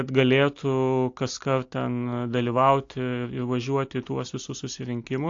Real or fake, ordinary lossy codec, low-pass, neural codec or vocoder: real; AAC, 48 kbps; 7.2 kHz; none